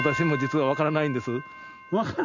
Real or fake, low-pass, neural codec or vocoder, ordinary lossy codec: real; 7.2 kHz; none; none